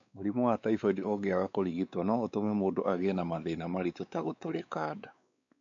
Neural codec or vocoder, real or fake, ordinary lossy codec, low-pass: codec, 16 kHz, 4 kbps, X-Codec, WavLM features, trained on Multilingual LibriSpeech; fake; none; 7.2 kHz